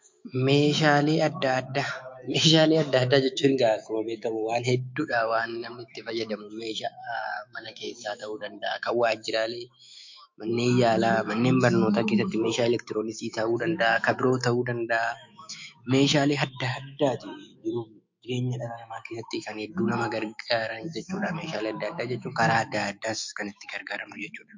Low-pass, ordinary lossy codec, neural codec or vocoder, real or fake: 7.2 kHz; MP3, 48 kbps; autoencoder, 48 kHz, 128 numbers a frame, DAC-VAE, trained on Japanese speech; fake